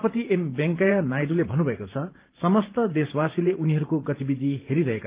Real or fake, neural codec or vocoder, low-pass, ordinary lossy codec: fake; vocoder, 44.1 kHz, 128 mel bands every 512 samples, BigVGAN v2; 3.6 kHz; Opus, 32 kbps